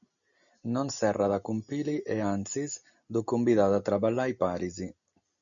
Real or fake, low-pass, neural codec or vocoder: real; 7.2 kHz; none